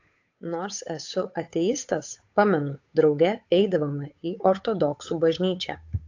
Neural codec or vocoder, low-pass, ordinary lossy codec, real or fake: codec, 16 kHz, 8 kbps, FunCodec, trained on Chinese and English, 25 frames a second; 7.2 kHz; AAC, 48 kbps; fake